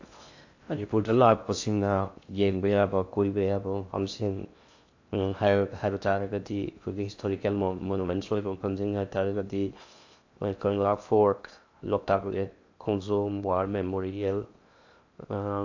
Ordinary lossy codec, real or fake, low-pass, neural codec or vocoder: AAC, 48 kbps; fake; 7.2 kHz; codec, 16 kHz in and 24 kHz out, 0.6 kbps, FocalCodec, streaming, 2048 codes